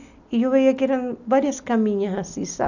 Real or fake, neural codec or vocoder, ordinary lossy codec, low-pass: real; none; none; 7.2 kHz